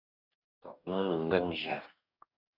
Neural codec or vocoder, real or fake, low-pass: codec, 44.1 kHz, 2.6 kbps, DAC; fake; 5.4 kHz